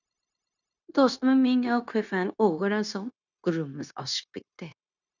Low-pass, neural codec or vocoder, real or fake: 7.2 kHz; codec, 16 kHz, 0.9 kbps, LongCat-Audio-Codec; fake